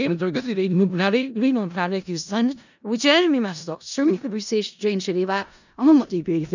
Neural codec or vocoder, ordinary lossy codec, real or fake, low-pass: codec, 16 kHz in and 24 kHz out, 0.4 kbps, LongCat-Audio-Codec, four codebook decoder; none; fake; 7.2 kHz